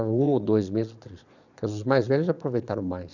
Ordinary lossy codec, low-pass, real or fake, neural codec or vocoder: none; 7.2 kHz; fake; vocoder, 22.05 kHz, 80 mel bands, Vocos